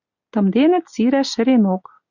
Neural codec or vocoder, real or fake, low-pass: none; real; 7.2 kHz